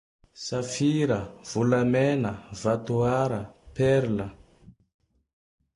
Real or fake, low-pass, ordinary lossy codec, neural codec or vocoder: real; 10.8 kHz; AAC, 64 kbps; none